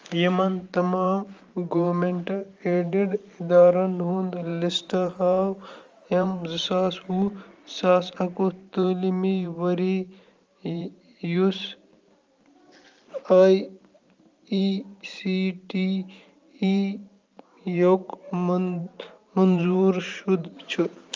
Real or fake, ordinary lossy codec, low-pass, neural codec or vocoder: fake; Opus, 32 kbps; 7.2 kHz; vocoder, 44.1 kHz, 128 mel bands every 512 samples, BigVGAN v2